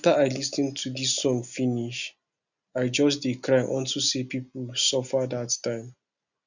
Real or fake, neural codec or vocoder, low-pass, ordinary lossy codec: real; none; 7.2 kHz; none